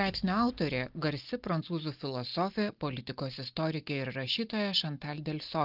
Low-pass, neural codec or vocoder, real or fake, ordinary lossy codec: 5.4 kHz; none; real; Opus, 32 kbps